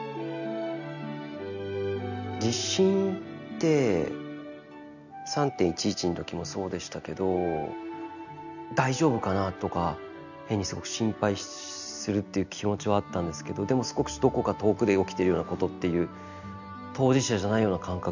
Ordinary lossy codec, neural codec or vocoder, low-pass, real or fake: none; none; 7.2 kHz; real